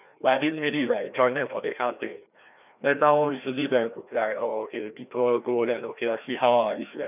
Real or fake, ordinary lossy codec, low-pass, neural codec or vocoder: fake; none; 3.6 kHz; codec, 16 kHz, 1 kbps, FreqCodec, larger model